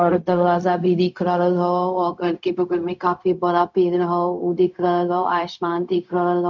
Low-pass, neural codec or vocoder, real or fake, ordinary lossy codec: 7.2 kHz; codec, 16 kHz, 0.4 kbps, LongCat-Audio-Codec; fake; none